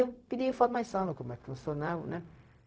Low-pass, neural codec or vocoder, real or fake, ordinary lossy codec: none; codec, 16 kHz, 0.4 kbps, LongCat-Audio-Codec; fake; none